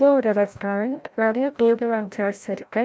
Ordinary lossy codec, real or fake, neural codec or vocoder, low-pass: none; fake; codec, 16 kHz, 0.5 kbps, FreqCodec, larger model; none